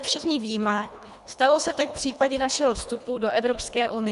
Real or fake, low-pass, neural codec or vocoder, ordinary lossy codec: fake; 10.8 kHz; codec, 24 kHz, 1.5 kbps, HILCodec; MP3, 96 kbps